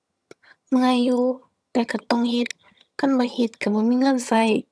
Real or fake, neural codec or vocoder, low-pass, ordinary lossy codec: fake; vocoder, 22.05 kHz, 80 mel bands, HiFi-GAN; none; none